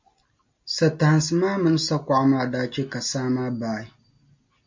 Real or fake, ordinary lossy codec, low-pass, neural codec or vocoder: real; MP3, 48 kbps; 7.2 kHz; none